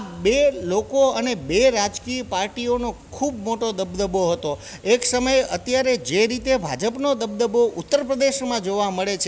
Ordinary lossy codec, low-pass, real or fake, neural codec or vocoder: none; none; real; none